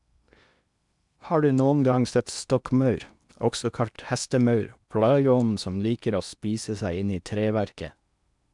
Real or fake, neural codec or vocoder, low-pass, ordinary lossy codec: fake; codec, 16 kHz in and 24 kHz out, 0.8 kbps, FocalCodec, streaming, 65536 codes; 10.8 kHz; none